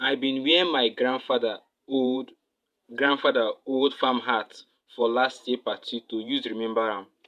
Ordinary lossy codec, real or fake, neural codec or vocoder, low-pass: MP3, 96 kbps; fake; vocoder, 48 kHz, 128 mel bands, Vocos; 14.4 kHz